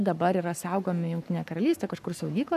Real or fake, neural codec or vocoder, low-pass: fake; codec, 44.1 kHz, 7.8 kbps, Pupu-Codec; 14.4 kHz